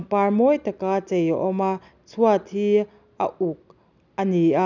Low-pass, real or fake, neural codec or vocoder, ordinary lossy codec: 7.2 kHz; real; none; none